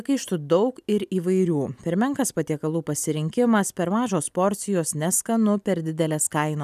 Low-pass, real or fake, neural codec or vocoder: 14.4 kHz; real; none